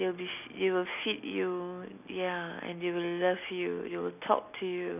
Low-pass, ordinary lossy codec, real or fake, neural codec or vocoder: 3.6 kHz; none; real; none